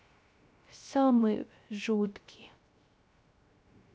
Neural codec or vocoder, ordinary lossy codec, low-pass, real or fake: codec, 16 kHz, 0.3 kbps, FocalCodec; none; none; fake